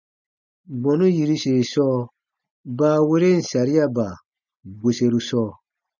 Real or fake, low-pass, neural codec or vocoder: real; 7.2 kHz; none